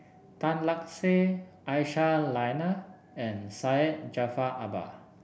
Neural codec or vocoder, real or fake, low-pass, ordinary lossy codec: none; real; none; none